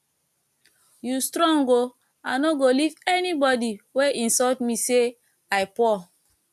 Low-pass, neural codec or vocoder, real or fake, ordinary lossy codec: 14.4 kHz; none; real; none